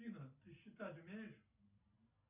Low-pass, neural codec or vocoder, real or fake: 3.6 kHz; none; real